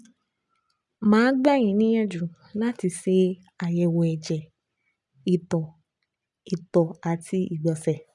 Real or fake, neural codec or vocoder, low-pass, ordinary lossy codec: real; none; 10.8 kHz; none